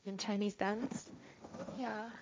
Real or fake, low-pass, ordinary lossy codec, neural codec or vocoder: fake; none; none; codec, 16 kHz, 1.1 kbps, Voila-Tokenizer